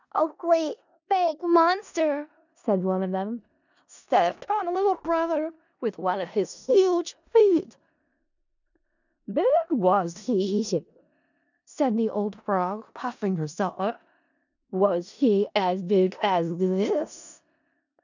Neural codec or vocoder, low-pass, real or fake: codec, 16 kHz in and 24 kHz out, 0.4 kbps, LongCat-Audio-Codec, four codebook decoder; 7.2 kHz; fake